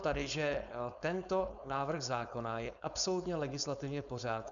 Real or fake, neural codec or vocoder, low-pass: fake; codec, 16 kHz, 4.8 kbps, FACodec; 7.2 kHz